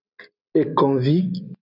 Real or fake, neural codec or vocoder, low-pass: real; none; 5.4 kHz